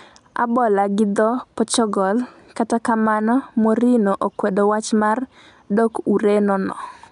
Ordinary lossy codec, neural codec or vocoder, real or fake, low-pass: none; none; real; 10.8 kHz